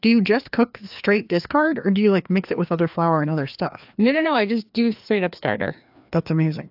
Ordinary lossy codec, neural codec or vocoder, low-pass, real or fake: AAC, 48 kbps; codec, 16 kHz, 2 kbps, FreqCodec, larger model; 5.4 kHz; fake